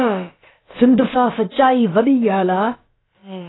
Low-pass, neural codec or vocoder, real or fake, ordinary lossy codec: 7.2 kHz; codec, 16 kHz, about 1 kbps, DyCAST, with the encoder's durations; fake; AAC, 16 kbps